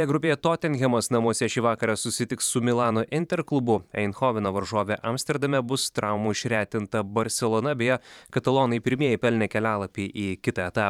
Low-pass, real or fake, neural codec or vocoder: 19.8 kHz; fake; vocoder, 44.1 kHz, 128 mel bands every 512 samples, BigVGAN v2